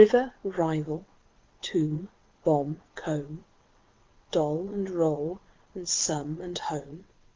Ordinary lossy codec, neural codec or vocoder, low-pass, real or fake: Opus, 32 kbps; vocoder, 22.05 kHz, 80 mel bands, WaveNeXt; 7.2 kHz; fake